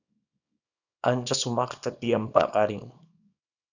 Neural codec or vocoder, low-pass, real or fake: codec, 24 kHz, 0.9 kbps, WavTokenizer, small release; 7.2 kHz; fake